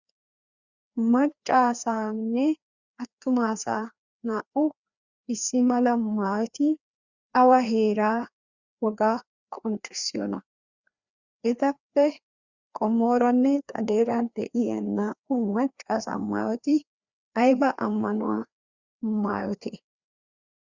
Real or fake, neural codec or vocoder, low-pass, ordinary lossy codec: fake; codec, 16 kHz, 2 kbps, FreqCodec, larger model; 7.2 kHz; Opus, 64 kbps